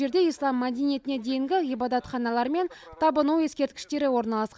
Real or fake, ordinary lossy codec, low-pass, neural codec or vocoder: real; none; none; none